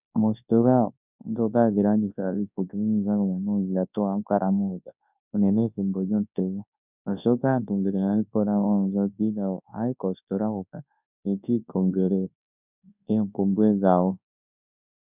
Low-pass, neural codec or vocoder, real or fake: 3.6 kHz; codec, 24 kHz, 0.9 kbps, WavTokenizer, large speech release; fake